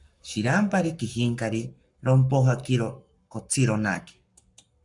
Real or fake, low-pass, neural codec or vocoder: fake; 10.8 kHz; codec, 44.1 kHz, 7.8 kbps, Pupu-Codec